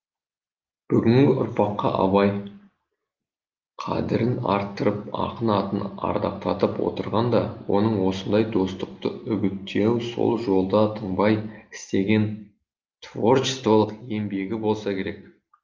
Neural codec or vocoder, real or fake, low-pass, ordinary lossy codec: none; real; 7.2 kHz; Opus, 32 kbps